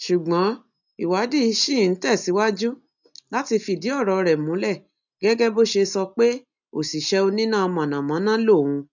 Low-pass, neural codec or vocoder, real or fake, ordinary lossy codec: 7.2 kHz; none; real; none